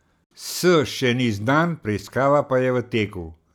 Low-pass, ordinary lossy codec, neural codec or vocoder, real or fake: none; none; none; real